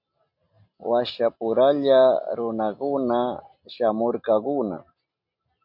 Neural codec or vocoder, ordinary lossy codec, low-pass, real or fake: none; MP3, 48 kbps; 5.4 kHz; real